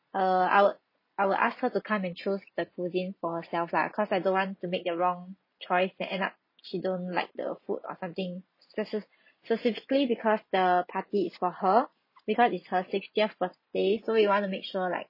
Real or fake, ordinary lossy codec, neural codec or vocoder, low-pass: real; MP3, 24 kbps; none; 5.4 kHz